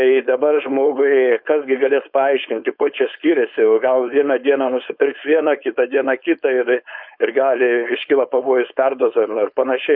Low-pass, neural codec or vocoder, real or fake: 5.4 kHz; codec, 16 kHz, 4.8 kbps, FACodec; fake